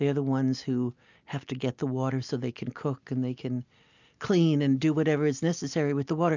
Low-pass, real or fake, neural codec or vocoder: 7.2 kHz; real; none